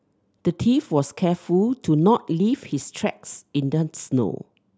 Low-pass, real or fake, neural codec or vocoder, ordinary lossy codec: none; real; none; none